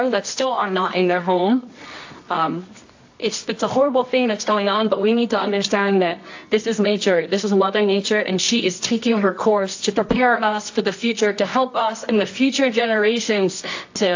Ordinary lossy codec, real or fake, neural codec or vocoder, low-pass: AAC, 48 kbps; fake; codec, 24 kHz, 0.9 kbps, WavTokenizer, medium music audio release; 7.2 kHz